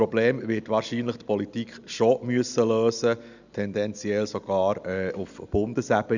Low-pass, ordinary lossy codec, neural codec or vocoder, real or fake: 7.2 kHz; none; none; real